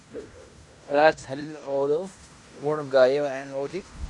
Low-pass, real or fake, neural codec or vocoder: 10.8 kHz; fake; codec, 16 kHz in and 24 kHz out, 0.9 kbps, LongCat-Audio-Codec, fine tuned four codebook decoder